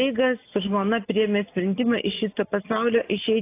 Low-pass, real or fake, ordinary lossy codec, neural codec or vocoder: 3.6 kHz; real; AAC, 24 kbps; none